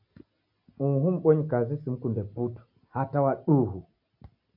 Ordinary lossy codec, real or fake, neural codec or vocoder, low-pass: MP3, 48 kbps; real; none; 5.4 kHz